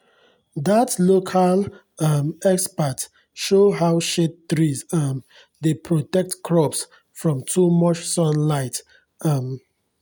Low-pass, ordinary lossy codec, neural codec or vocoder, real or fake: none; none; none; real